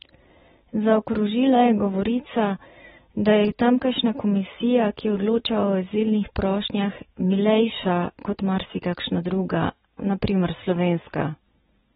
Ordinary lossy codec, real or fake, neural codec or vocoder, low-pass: AAC, 16 kbps; real; none; 19.8 kHz